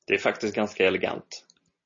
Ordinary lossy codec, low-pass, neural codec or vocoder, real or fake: MP3, 32 kbps; 7.2 kHz; none; real